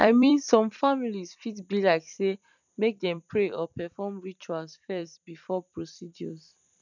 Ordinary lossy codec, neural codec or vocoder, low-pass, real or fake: none; vocoder, 44.1 kHz, 128 mel bands every 256 samples, BigVGAN v2; 7.2 kHz; fake